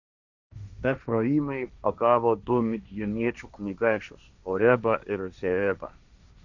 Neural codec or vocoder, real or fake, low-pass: codec, 16 kHz, 1.1 kbps, Voila-Tokenizer; fake; 7.2 kHz